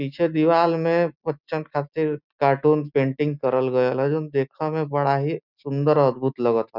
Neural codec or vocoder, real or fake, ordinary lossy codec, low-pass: none; real; none; 5.4 kHz